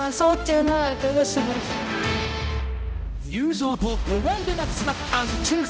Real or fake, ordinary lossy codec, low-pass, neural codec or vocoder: fake; none; none; codec, 16 kHz, 0.5 kbps, X-Codec, HuBERT features, trained on balanced general audio